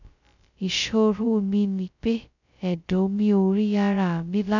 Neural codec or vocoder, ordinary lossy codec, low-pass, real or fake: codec, 16 kHz, 0.2 kbps, FocalCodec; none; 7.2 kHz; fake